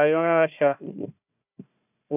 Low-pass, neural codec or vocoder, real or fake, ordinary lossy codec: 3.6 kHz; codec, 16 kHz, 1 kbps, FunCodec, trained on Chinese and English, 50 frames a second; fake; none